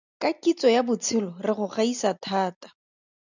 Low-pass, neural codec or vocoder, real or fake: 7.2 kHz; none; real